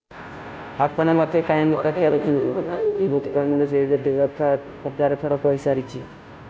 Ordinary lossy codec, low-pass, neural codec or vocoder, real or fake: none; none; codec, 16 kHz, 0.5 kbps, FunCodec, trained on Chinese and English, 25 frames a second; fake